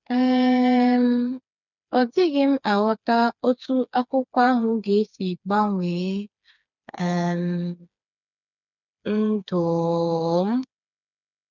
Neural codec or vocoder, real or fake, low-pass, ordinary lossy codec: codec, 16 kHz, 4 kbps, FreqCodec, smaller model; fake; 7.2 kHz; none